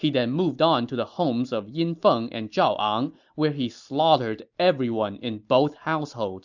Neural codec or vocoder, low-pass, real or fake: none; 7.2 kHz; real